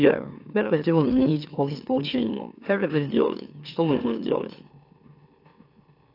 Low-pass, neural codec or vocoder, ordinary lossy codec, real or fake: 5.4 kHz; autoencoder, 44.1 kHz, a latent of 192 numbers a frame, MeloTTS; AAC, 32 kbps; fake